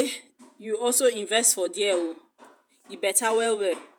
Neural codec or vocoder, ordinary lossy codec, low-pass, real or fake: vocoder, 48 kHz, 128 mel bands, Vocos; none; none; fake